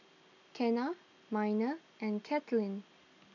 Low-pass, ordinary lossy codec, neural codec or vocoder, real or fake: 7.2 kHz; none; none; real